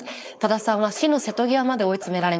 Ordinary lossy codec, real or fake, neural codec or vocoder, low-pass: none; fake; codec, 16 kHz, 4.8 kbps, FACodec; none